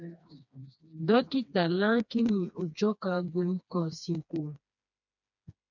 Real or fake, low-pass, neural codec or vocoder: fake; 7.2 kHz; codec, 16 kHz, 2 kbps, FreqCodec, smaller model